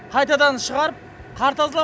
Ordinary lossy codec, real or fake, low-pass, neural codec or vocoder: none; real; none; none